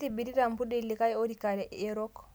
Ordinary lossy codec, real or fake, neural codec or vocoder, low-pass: none; real; none; none